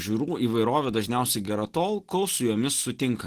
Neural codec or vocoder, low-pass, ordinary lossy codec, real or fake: none; 14.4 kHz; Opus, 16 kbps; real